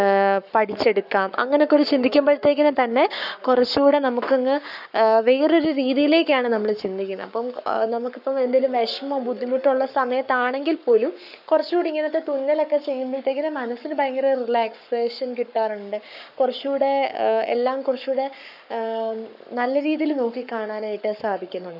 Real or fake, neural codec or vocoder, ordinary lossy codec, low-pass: fake; codec, 44.1 kHz, 7.8 kbps, Pupu-Codec; none; 5.4 kHz